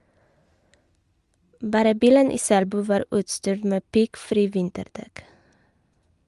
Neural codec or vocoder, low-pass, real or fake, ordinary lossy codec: none; 10.8 kHz; real; Opus, 32 kbps